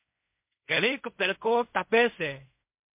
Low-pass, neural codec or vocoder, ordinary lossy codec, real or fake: 3.6 kHz; codec, 16 kHz in and 24 kHz out, 0.4 kbps, LongCat-Audio-Codec, fine tuned four codebook decoder; MP3, 32 kbps; fake